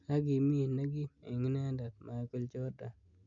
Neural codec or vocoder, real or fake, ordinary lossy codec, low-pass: none; real; MP3, 64 kbps; 7.2 kHz